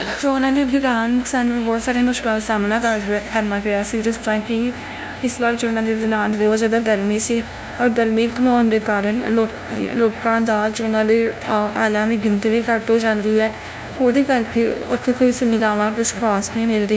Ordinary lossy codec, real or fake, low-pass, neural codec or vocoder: none; fake; none; codec, 16 kHz, 0.5 kbps, FunCodec, trained on LibriTTS, 25 frames a second